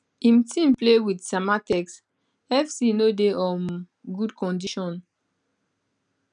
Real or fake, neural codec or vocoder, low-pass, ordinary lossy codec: real; none; 9.9 kHz; none